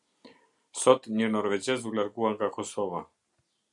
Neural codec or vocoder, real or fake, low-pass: none; real; 10.8 kHz